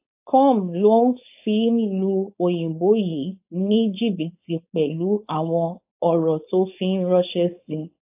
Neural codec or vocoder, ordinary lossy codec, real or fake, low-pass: codec, 16 kHz, 4.8 kbps, FACodec; AAC, 32 kbps; fake; 3.6 kHz